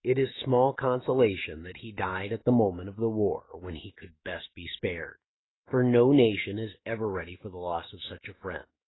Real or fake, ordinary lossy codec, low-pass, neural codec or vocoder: fake; AAC, 16 kbps; 7.2 kHz; autoencoder, 48 kHz, 128 numbers a frame, DAC-VAE, trained on Japanese speech